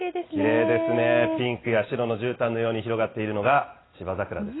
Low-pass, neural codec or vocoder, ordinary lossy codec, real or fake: 7.2 kHz; none; AAC, 16 kbps; real